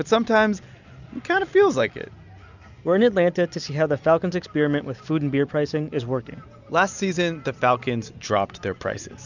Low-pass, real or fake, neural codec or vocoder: 7.2 kHz; real; none